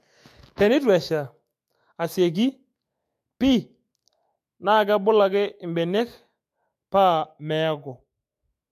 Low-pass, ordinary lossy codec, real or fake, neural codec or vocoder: 14.4 kHz; MP3, 64 kbps; fake; autoencoder, 48 kHz, 128 numbers a frame, DAC-VAE, trained on Japanese speech